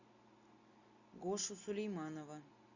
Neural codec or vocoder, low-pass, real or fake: none; 7.2 kHz; real